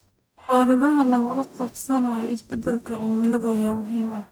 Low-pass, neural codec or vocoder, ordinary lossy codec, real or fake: none; codec, 44.1 kHz, 0.9 kbps, DAC; none; fake